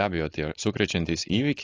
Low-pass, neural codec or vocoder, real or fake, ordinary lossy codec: 7.2 kHz; codec, 16 kHz, 4.8 kbps, FACodec; fake; AAC, 32 kbps